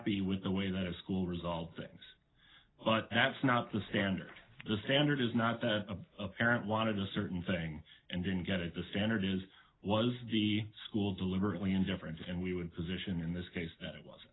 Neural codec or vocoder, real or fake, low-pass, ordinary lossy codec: none; real; 7.2 kHz; AAC, 16 kbps